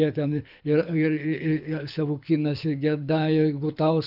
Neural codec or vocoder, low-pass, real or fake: codec, 24 kHz, 6 kbps, HILCodec; 5.4 kHz; fake